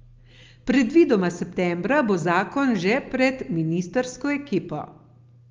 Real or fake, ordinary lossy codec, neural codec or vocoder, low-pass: real; Opus, 32 kbps; none; 7.2 kHz